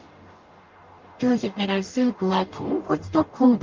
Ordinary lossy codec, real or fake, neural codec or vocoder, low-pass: Opus, 32 kbps; fake; codec, 44.1 kHz, 0.9 kbps, DAC; 7.2 kHz